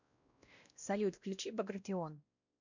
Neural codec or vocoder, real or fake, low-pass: codec, 16 kHz, 1 kbps, X-Codec, WavLM features, trained on Multilingual LibriSpeech; fake; 7.2 kHz